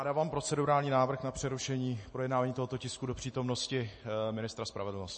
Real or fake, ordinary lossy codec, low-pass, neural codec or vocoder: real; MP3, 32 kbps; 10.8 kHz; none